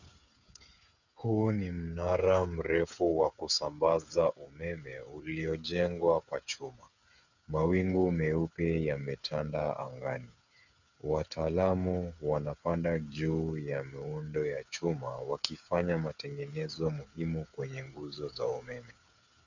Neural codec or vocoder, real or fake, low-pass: codec, 16 kHz, 8 kbps, FreqCodec, smaller model; fake; 7.2 kHz